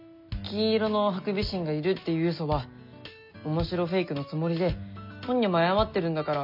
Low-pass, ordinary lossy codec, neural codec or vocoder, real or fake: 5.4 kHz; MP3, 48 kbps; none; real